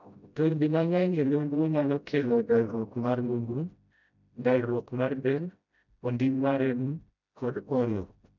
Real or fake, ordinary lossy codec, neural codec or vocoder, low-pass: fake; none; codec, 16 kHz, 0.5 kbps, FreqCodec, smaller model; 7.2 kHz